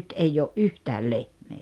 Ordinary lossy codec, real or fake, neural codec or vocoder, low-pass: Opus, 32 kbps; real; none; 19.8 kHz